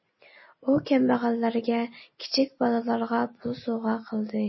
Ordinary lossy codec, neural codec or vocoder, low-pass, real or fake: MP3, 24 kbps; none; 7.2 kHz; real